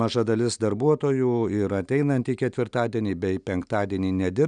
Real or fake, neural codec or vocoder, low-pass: real; none; 9.9 kHz